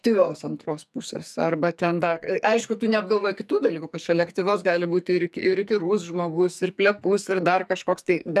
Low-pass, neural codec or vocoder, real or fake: 14.4 kHz; codec, 44.1 kHz, 2.6 kbps, SNAC; fake